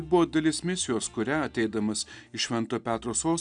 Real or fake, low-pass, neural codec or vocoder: real; 9.9 kHz; none